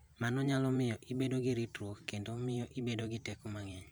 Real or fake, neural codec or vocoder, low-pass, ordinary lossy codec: fake; vocoder, 44.1 kHz, 128 mel bands every 512 samples, BigVGAN v2; none; none